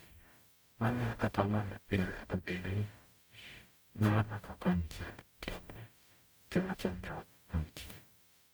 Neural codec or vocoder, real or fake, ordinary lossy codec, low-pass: codec, 44.1 kHz, 0.9 kbps, DAC; fake; none; none